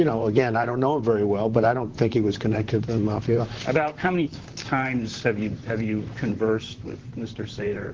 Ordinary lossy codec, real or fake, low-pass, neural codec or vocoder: Opus, 16 kbps; fake; 7.2 kHz; vocoder, 44.1 kHz, 128 mel bands, Pupu-Vocoder